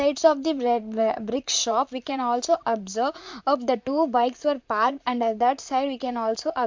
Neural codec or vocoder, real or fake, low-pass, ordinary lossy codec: vocoder, 44.1 kHz, 128 mel bands, Pupu-Vocoder; fake; 7.2 kHz; MP3, 64 kbps